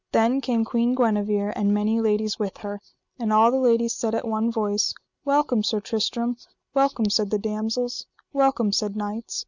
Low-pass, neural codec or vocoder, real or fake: 7.2 kHz; none; real